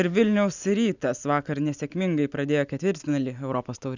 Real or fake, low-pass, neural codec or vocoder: real; 7.2 kHz; none